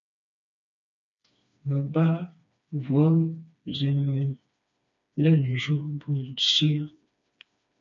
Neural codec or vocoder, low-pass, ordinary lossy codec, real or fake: codec, 16 kHz, 2 kbps, FreqCodec, smaller model; 7.2 kHz; MP3, 64 kbps; fake